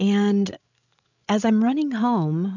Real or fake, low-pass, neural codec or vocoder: fake; 7.2 kHz; codec, 16 kHz, 16 kbps, FreqCodec, larger model